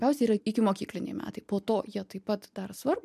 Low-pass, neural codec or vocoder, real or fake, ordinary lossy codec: 14.4 kHz; none; real; MP3, 96 kbps